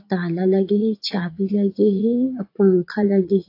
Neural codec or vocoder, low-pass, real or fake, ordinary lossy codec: vocoder, 44.1 kHz, 80 mel bands, Vocos; 5.4 kHz; fake; AAC, 32 kbps